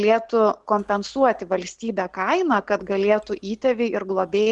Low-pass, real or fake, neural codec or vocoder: 10.8 kHz; real; none